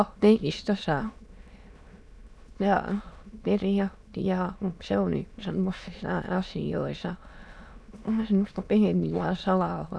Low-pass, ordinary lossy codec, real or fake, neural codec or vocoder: none; none; fake; autoencoder, 22.05 kHz, a latent of 192 numbers a frame, VITS, trained on many speakers